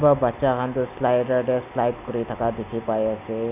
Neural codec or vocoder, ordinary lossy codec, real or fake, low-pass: none; none; real; 3.6 kHz